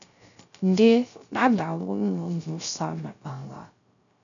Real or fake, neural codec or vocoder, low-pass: fake; codec, 16 kHz, 0.3 kbps, FocalCodec; 7.2 kHz